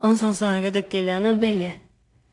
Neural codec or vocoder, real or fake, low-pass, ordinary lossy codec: codec, 16 kHz in and 24 kHz out, 0.4 kbps, LongCat-Audio-Codec, two codebook decoder; fake; 10.8 kHz; AAC, 64 kbps